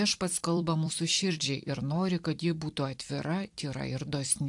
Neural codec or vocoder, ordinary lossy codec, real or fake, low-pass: vocoder, 44.1 kHz, 128 mel bands every 256 samples, BigVGAN v2; AAC, 64 kbps; fake; 10.8 kHz